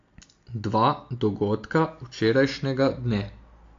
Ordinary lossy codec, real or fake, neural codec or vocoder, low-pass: AAC, 48 kbps; real; none; 7.2 kHz